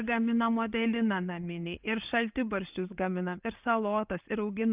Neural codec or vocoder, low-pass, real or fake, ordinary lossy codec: none; 3.6 kHz; real; Opus, 32 kbps